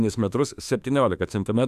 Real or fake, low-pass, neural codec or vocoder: fake; 14.4 kHz; autoencoder, 48 kHz, 32 numbers a frame, DAC-VAE, trained on Japanese speech